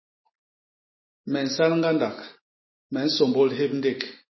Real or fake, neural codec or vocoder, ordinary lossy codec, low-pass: real; none; MP3, 24 kbps; 7.2 kHz